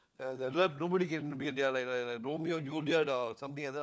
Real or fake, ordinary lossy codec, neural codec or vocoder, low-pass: fake; none; codec, 16 kHz, 4 kbps, FunCodec, trained on LibriTTS, 50 frames a second; none